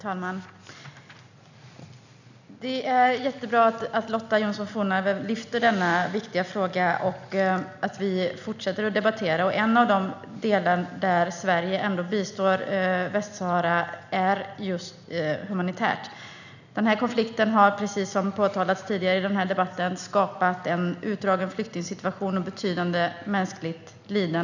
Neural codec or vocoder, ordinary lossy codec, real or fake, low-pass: none; none; real; 7.2 kHz